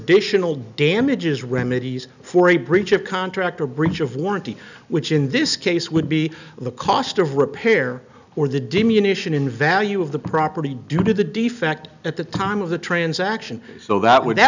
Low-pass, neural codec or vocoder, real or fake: 7.2 kHz; none; real